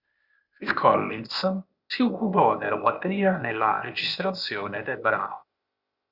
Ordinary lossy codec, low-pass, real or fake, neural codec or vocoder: Opus, 64 kbps; 5.4 kHz; fake; codec, 16 kHz, 0.8 kbps, ZipCodec